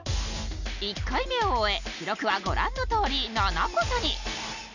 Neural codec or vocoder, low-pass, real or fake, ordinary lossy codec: none; 7.2 kHz; real; none